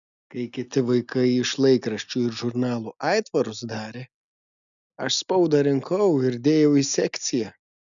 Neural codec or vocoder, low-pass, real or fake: none; 7.2 kHz; real